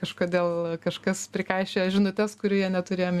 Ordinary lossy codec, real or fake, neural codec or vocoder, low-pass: Opus, 64 kbps; real; none; 14.4 kHz